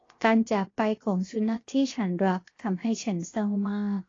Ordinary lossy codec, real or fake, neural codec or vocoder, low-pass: AAC, 32 kbps; fake; codec, 16 kHz, 0.7 kbps, FocalCodec; 7.2 kHz